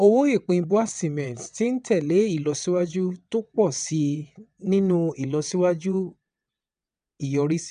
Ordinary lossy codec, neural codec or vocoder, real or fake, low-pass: none; vocoder, 22.05 kHz, 80 mel bands, WaveNeXt; fake; 9.9 kHz